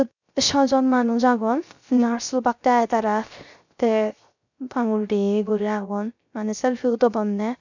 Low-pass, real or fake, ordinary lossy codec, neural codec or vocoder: 7.2 kHz; fake; none; codec, 16 kHz, 0.3 kbps, FocalCodec